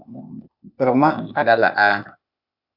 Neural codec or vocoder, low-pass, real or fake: codec, 16 kHz, 0.8 kbps, ZipCodec; 5.4 kHz; fake